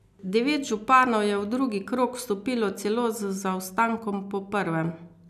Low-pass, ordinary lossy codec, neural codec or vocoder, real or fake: 14.4 kHz; none; none; real